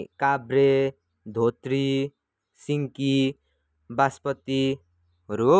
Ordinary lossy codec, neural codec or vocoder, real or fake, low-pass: none; none; real; none